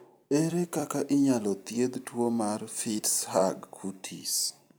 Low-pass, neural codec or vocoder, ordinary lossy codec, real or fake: none; vocoder, 44.1 kHz, 128 mel bands every 256 samples, BigVGAN v2; none; fake